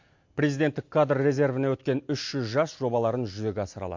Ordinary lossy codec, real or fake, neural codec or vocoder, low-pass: MP3, 48 kbps; real; none; 7.2 kHz